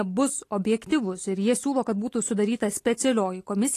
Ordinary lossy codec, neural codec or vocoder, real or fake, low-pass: AAC, 48 kbps; none; real; 14.4 kHz